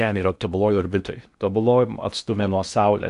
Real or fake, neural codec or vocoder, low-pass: fake; codec, 16 kHz in and 24 kHz out, 0.8 kbps, FocalCodec, streaming, 65536 codes; 10.8 kHz